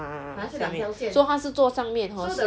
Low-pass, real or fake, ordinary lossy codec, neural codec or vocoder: none; real; none; none